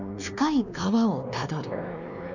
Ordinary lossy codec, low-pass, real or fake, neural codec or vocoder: none; 7.2 kHz; fake; codec, 16 kHz, 2 kbps, X-Codec, WavLM features, trained on Multilingual LibriSpeech